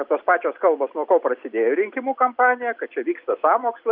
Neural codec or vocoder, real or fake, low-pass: none; real; 5.4 kHz